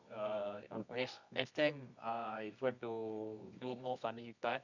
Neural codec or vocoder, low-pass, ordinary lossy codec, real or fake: codec, 24 kHz, 0.9 kbps, WavTokenizer, medium music audio release; 7.2 kHz; none; fake